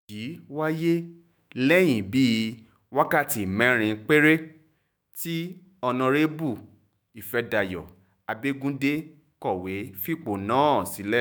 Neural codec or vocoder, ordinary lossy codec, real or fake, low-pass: autoencoder, 48 kHz, 128 numbers a frame, DAC-VAE, trained on Japanese speech; none; fake; none